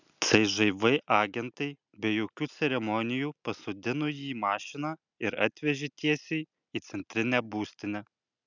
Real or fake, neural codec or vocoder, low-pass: real; none; 7.2 kHz